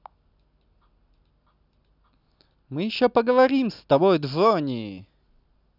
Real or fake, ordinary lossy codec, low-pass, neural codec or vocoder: real; none; 5.4 kHz; none